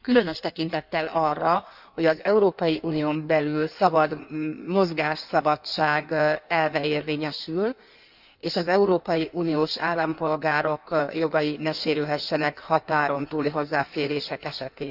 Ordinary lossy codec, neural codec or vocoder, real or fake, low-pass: none; codec, 16 kHz in and 24 kHz out, 1.1 kbps, FireRedTTS-2 codec; fake; 5.4 kHz